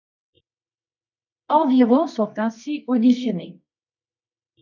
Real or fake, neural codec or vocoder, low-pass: fake; codec, 24 kHz, 0.9 kbps, WavTokenizer, medium music audio release; 7.2 kHz